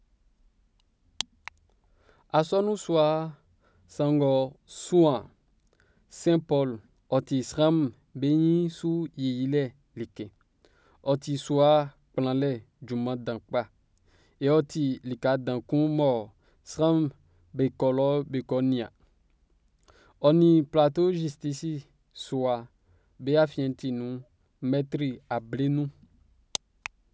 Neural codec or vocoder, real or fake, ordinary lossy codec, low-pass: none; real; none; none